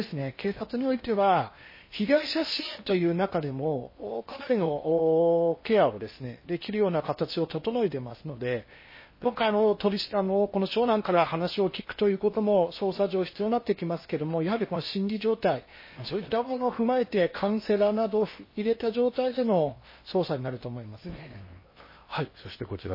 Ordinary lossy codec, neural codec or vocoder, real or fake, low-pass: MP3, 24 kbps; codec, 16 kHz in and 24 kHz out, 0.8 kbps, FocalCodec, streaming, 65536 codes; fake; 5.4 kHz